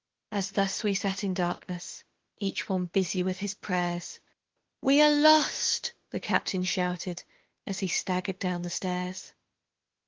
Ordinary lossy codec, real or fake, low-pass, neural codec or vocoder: Opus, 16 kbps; fake; 7.2 kHz; autoencoder, 48 kHz, 32 numbers a frame, DAC-VAE, trained on Japanese speech